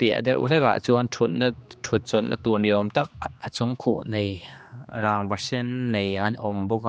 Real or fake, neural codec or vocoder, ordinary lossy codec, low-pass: fake; codec, 16 kHz, 2 kbps, X-Codec, HuBERT features, trained on general audio; none; none